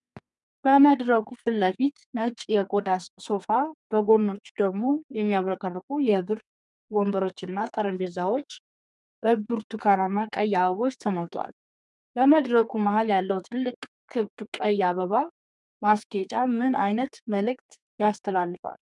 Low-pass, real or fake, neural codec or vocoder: 10.8 kHz; fake; codec, 32 kHz, 1.9 kbps, SNAC